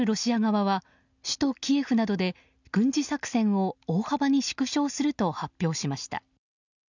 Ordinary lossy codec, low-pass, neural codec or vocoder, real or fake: none; 7.2 kHz; none; real